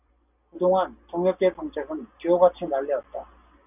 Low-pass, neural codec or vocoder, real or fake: 3.6 kHz; none; real